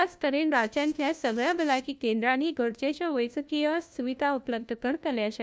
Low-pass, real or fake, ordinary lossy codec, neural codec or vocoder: none; fake; none; codec, 16 kHz, 1 kbps, FunCodec, trained on LibriTTS, 50 frames a second